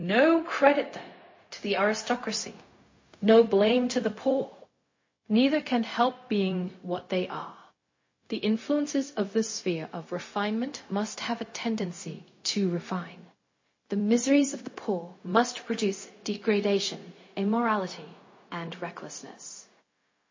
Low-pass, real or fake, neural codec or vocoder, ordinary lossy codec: 7.2 kHz; fake; codec, 16 kHz, 0.4 kbps, LongCat-Audio-Codec; MP3, 32 kbps